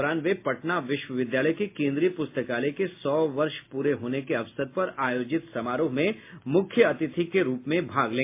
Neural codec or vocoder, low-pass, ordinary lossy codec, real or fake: none; 3.6 kHz; MP3, 24 kbps; real